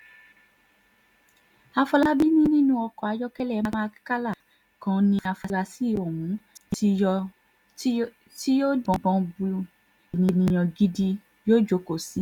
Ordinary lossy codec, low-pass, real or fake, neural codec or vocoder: none; 19.8 kHz; real; none